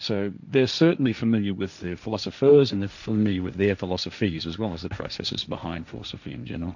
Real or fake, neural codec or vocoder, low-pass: fake; codec, 16 kHz, 1.1 kbps, Voila-Tokenizer; 7.2 kHz